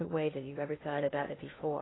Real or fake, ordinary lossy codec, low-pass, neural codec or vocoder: fake; AAC, 16 kbps; 7.2 kHz; codec, 16 kHz in and 24 kHz out, 0.6 kbps, FocalCodec, streaming, 2048 codes